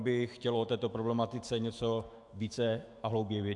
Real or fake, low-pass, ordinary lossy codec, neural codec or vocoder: fake; 10.8 kHz; AAC, 64 kbps; autoencoder, 48 kHz, 128 numbers a frame, DAC-VAE, trained on Japanese speech